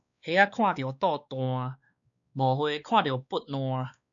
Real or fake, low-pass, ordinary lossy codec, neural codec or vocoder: fake; 7.2 kHz; MP3, 96 kbps; codec, 16 kHz, 2 kbps, X-Codec, WavLM features, trained on Multilingual LibriSpeech